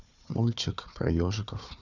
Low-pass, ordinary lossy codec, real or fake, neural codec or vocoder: 7.2 kHz; none; fake; codec, 16 kHz, 4 kbps, FunCodec, trained on Chinese and English, 50 frames a second